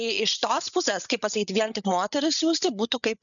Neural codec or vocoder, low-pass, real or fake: codec, 16 kHz, 8 kbps, FreqCodec, larger model; 7.2 kHz; fake